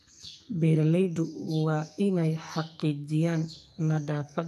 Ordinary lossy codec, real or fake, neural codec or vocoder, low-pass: none; fake; codec, 44.1 kHz, 2.6 kbps, SNAC; 14.4 kHz